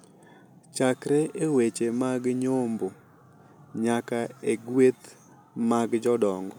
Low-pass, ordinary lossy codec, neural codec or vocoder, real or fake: none; none; none; real